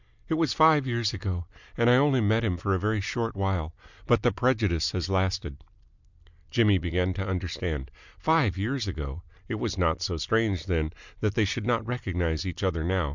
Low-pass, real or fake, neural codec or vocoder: 7.2 kHz; real; none